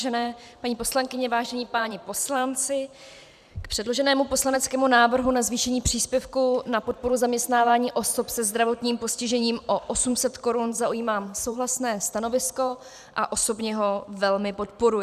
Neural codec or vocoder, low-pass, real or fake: vocoder, 44.1 kHz, 128 mel bands every 512 samples, BigVGAN v2; 14.4 kHz; fake